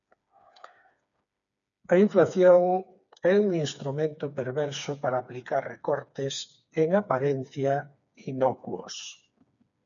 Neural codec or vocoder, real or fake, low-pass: codec, 16 kHz, 4 kbps, FreqCodec, smaller model; fake; 7.2 kHz